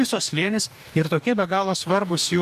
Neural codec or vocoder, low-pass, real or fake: codec, 44.1 kHz, 2.6 kbps, DAC; 14.4 kHz; fake